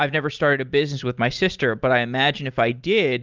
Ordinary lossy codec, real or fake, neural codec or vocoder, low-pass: Opus, 24 kbps; real; none; 7.2 kHz